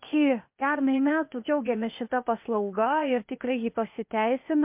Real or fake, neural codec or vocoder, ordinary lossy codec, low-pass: fake; codec, 16 kHz, 0.8 kbps, ZipCodec; MP3, 32 kbps; 3.6 kHz